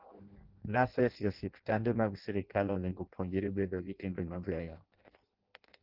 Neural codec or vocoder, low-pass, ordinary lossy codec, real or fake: codec, 16 kHz in and 24 kHz out, 0.6 kbps, FireRedTTS-2 codec; 5.4 kHz; Opus, 16 kbps; fake